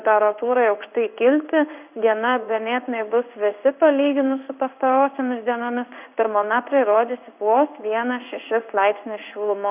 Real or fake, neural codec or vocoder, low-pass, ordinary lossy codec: fake; codec, 16 kHz in and 24 kHz out, 1 kbps, XY-Tokenizer; 3.6 kHz; Opus, 64 kbps